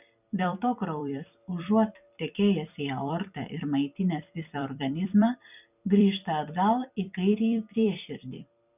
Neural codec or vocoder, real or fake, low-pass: vocoder, 44.1 kHz, 128 mel bands every 256 samples, BigVGAN v2; fake; 3.6 kHz